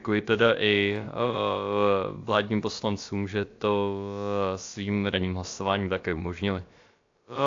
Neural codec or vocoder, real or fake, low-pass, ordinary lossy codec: codec, 16 kHz, about 1 kbps, DyCAST, with the encoder's durations; fake; 7.2 kHz; AAC, 48 kbps